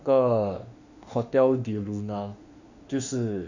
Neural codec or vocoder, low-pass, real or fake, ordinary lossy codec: autoencoder, 48 kHz, 32 numbers a frame, DAC-VAE, trained on Japanese speech; 7.2 kHz; fake; none